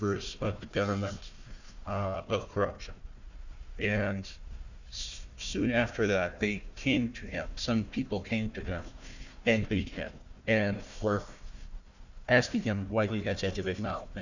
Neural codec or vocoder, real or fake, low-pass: codec, 16 kHz, 1 kbps, FunCodec, trained on Chinese and English, 50 frames a second; fake; 7.2 kHz